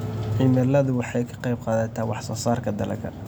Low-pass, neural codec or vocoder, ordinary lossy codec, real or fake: none; none; none; real